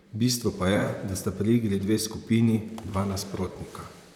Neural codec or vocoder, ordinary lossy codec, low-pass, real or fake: vocoder, 44.1 kHz, 128 mel bands, Pupu-Vocoder; none; 19.8 kHz; fake